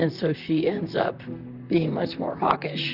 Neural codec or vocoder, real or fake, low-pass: vocoder, 44.1 kHz, 128 mel bands, Pupu-Vocoder; fake; 5.4 kHz